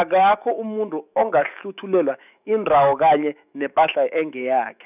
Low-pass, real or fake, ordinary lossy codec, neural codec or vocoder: 3.6 kHz; fake; none; autoencoder, 48 kHz, 128 numbers a frame, DAC-VAE, trained on Japanese speech